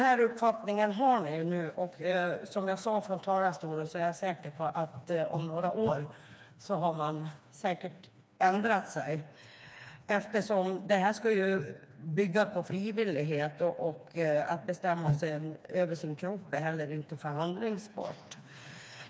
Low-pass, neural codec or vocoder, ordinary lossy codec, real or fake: none; codec, 16 kHz, 2 kbps, FreqCodec, smaller model; none; fake